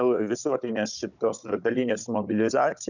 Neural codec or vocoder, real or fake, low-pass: codec, 16 kHz, 16 kbps, FunCodec, trained on Chinese and English, 50 frames a second; fake; 7.2 kHz